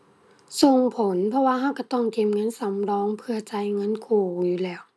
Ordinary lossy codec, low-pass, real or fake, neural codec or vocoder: none; none; real; none